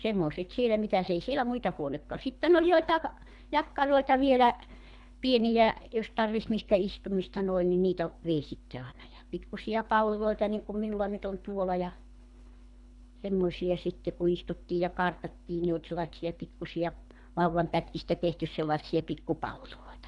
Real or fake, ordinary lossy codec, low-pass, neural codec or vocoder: fake; none; 10.8 kHz; codec, 24 kHz, 3 kbps, HILCodec